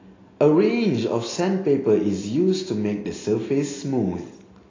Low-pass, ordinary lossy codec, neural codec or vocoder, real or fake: 7.2 kHz; AAC, 32 kbps; none; real